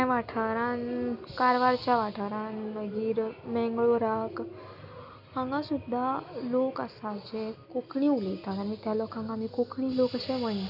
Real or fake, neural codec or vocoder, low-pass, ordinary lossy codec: real; none; 5.4 kHz; none